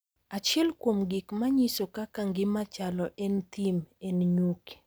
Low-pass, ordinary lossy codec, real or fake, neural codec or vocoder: none; none; real; none